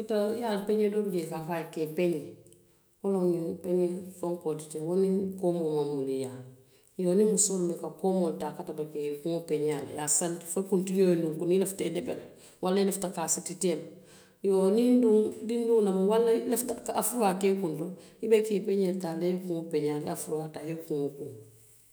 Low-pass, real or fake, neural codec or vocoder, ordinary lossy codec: none; fake; autoencoder, 48 kHz, 128 numbers a frame, DAC-VAE, trained on Japanese speech; none